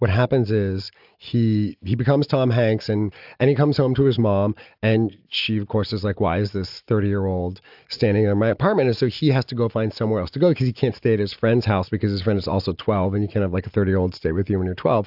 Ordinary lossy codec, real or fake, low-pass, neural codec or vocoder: AAC, 48 kbps; real; 5.4 kHz; none